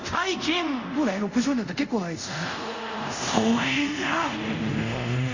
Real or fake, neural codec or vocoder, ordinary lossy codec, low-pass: fake; codec, 24 kHz, 0.5 kbps, DualCodec; Opus, 64 kbps; 7.2 kHz